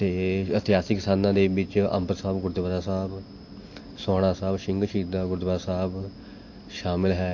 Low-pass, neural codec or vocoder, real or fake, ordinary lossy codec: 7.2 kHz; none; real; AAC, 48 kbps